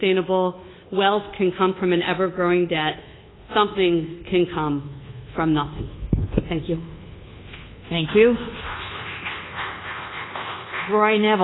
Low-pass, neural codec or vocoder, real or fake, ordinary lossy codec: 7.2 kHz; codec, 24 kHz, 1.2 kbps, DualCodec; fake; AAC, 16 kbps